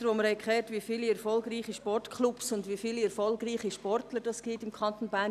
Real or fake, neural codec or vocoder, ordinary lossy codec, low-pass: real; none; none; 14.4 kHz